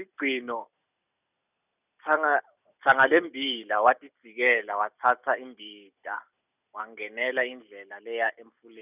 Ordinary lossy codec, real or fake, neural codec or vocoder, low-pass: none; real; none; 3.6 kHz